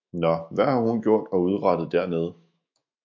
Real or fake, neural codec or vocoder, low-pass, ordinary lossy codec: fake; autoencoder, 48 kHz, 128 numbers a frame, DAC-VAE, trained on Japanese speech; 7.2 kHz; MP3, 48 kbps